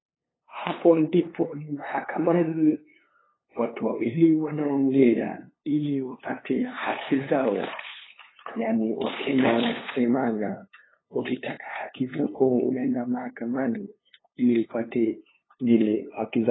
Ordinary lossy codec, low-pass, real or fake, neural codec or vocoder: AAC, 16 kbps; 7.2 kHz; fake; codec, 16 kHz, 2 kbps, FunCodec, trained on LibriTTS, 25 frames a second